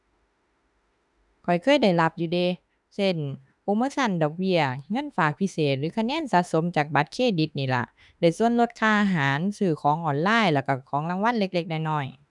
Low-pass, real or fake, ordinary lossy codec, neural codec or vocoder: 10.8 kHz; fake; none; autoencoder, 48 kHz, 32 numbers a frame, DAC-VAE, trained on Japanese speech